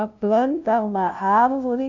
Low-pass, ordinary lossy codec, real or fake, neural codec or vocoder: 7.2 kHz; AAC, 48 kbps; fake; codec, 16 kHz, 0.5 kbps, FunCodec, trained on LibriTTS, 25 frames a second